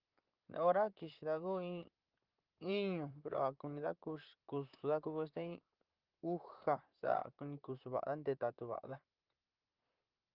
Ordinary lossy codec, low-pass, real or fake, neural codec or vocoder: Opus, 32 kbps; 5.4 kHz; fake; vocoder, 44.1 kHz, 128 mel bands, Pupu-Vocoder